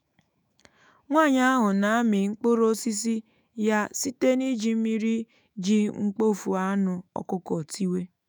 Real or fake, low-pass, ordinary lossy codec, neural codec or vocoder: fake; none; none; autoencoder, 48 kHz, 128 numbers a frame, DAC-VAE, trained on Japanese speech